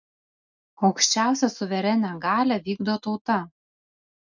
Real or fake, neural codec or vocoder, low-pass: real; none; 7.2 kHz